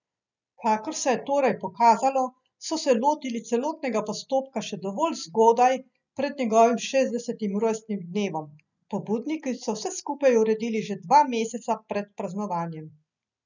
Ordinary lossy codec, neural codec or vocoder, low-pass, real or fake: none; none; 7.2 kHz; real